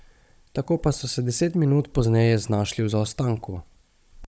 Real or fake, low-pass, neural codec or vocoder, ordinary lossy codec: fake; none; codec, 16 kHz, 16 kbps, FunCodec, trained on Chinese and English, 50 frames a second; none